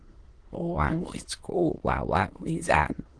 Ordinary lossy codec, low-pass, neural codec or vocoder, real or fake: Opus, 16 kbps; 9.9 kHz; autoencoder, 22.05 kHz, a latent of 192 numbers a frame, VITS, trained on many speakers; fake